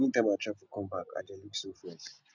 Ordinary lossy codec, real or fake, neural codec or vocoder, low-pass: none; real; none; 7.2 kHz